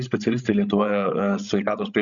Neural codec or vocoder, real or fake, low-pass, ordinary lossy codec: codec, 16 kHz, 16 kbps, FreqCodec, larger model; fake; 7.2 kHz; MP3, 96 kbps